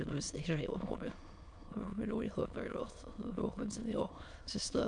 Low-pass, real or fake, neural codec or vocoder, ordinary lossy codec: 9.9 kHz; fake; autoencoder, 22.05 kHz, a latent of 192 numbers a frame, VITS, trained on many speakers; MP3, 64 kbps